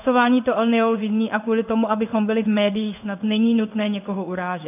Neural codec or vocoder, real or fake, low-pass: codec, 16 kHz in and 24 kHz out, 1 kbps, XY-Tokenizer; fake; 3.6 kHz